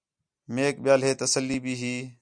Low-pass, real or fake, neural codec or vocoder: 9.9 kHz; real; none